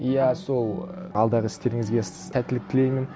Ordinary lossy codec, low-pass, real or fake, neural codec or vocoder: none; none; real; none